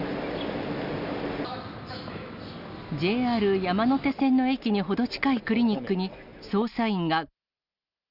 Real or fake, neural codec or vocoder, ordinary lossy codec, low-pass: real; none; none; 5.4 kHz